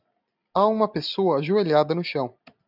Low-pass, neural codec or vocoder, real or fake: 5.4 kHz; none; real